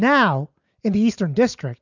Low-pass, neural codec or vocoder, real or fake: 7.2 kHz; none; real